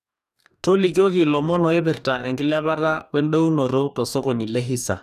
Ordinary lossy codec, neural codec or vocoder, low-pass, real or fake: none; codec, 44.1 kHz, 2.6 kbps, DAC; 14.4 kHz; fake